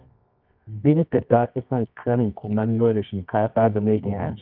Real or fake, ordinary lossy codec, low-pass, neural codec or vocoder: fake; none; 5.4 kHz; codec, 24 kHz, 0.9 kbps, WavTokenizer, medium music audio release